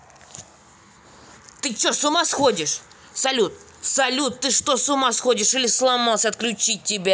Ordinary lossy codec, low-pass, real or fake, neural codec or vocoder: none; none; real; none